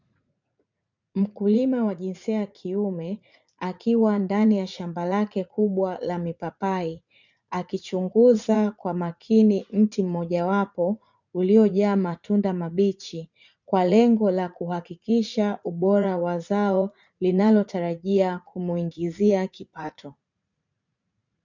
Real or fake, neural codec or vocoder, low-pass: fake; vocoder, 44.1 kHz, 80 mel bands, Vocos; 7.2 kHz